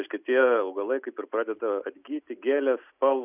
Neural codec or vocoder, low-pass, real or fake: none; 3.6 kHz; real